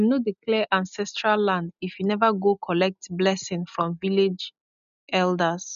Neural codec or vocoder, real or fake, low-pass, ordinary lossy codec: none; real; 7.2 kHz; none